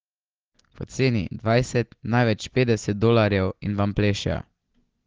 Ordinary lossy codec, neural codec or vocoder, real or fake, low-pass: Opus, 16 kbps; none; real; 7.2 kHz